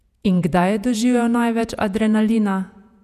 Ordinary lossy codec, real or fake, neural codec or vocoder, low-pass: none; fake; vocoder, 48 kHz, 128 mel bands, Vocos; 14.4 kHz